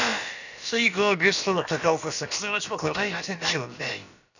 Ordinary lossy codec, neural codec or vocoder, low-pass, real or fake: none; codec, 16 kHz, about 1 kbps, DyCAST, with the encoder's durations; 7.2 kHz; fake